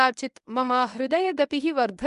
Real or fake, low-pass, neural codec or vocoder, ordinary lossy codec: fake; 10.8 kHz; codec, 24 kHz, 0.9 kbps, WavTokenizer, medium speech release version 1; none